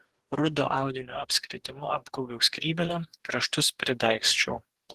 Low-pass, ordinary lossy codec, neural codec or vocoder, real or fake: 14.4 kHz; Opus, 16 kbps; codec, 44.1 kHz, 2.6 kbps, DAC; fake